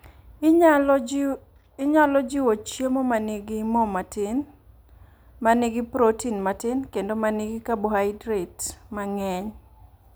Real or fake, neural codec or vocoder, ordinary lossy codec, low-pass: real; none; none; none